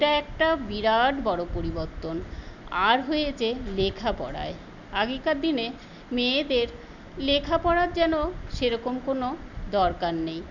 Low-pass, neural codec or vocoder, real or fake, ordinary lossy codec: 7.2 kHz; none; real; none